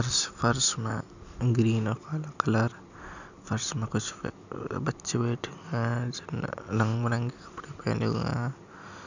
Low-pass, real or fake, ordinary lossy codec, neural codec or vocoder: 7.2 kHz; real; none; none